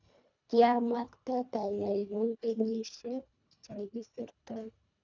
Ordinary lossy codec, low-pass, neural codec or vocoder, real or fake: none; 7.2 kHz; codec, 24 kHz, 1.5 kbps, HILCodec; fake